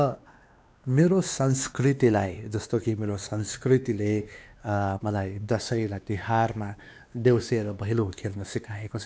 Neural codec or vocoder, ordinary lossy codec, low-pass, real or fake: codec, 16 kHz, 2 kbps, X-Codec, WavLM features, trained on Multilingual LibriSpeech; none; none; fake